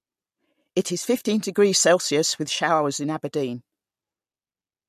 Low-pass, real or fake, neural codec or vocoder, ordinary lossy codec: 14.4 kHz; real; none; MP3, 64 kbps